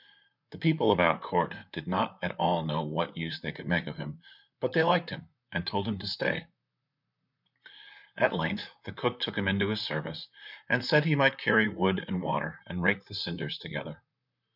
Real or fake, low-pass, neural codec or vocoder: fake; 5.4 kHz; vocoder, 44.1 kHz, 128 mel bands, Pupu-Vocoder